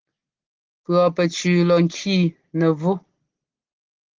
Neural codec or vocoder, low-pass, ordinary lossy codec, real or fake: none; 7.2 kHz; Opus, 16 kbps; real